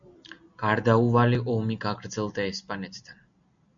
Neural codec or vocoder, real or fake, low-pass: none; real; 7.2 kHz